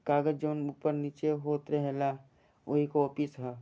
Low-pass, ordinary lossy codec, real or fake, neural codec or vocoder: none; none; real; none